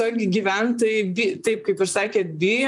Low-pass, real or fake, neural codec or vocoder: 10.8 kHz; fake; vocoder, 44.1 kHz, 128 mel bands, Pupu-Vocoder